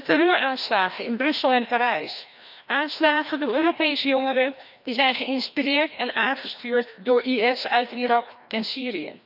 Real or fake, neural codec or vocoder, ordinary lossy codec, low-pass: fake; codec, 16 kHz, 1 kbps, FreqCodec, larger model; none; 5.4 kHz